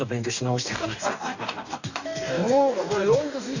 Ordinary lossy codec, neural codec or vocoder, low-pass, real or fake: none; codec, 44.1 kHz, 2.6 kbps, DAC; 7.2 kHz; fake